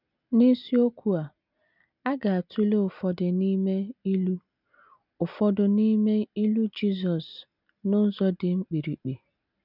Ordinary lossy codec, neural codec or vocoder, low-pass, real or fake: none; none; 5.4 kHz; real